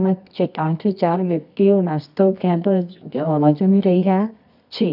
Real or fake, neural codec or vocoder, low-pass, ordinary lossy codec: fake; codec, 24 kHz, 0.9 kbps, WavTokenizer, medium music audio release; 5.4 kHz; none